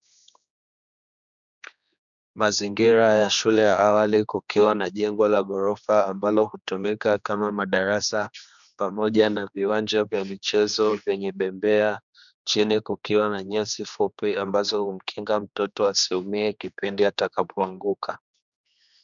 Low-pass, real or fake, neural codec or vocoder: 7.2 kHz; fake; codec, 16 kHz, 2 kbps, X-Codec, HuBERT features, trained on general audio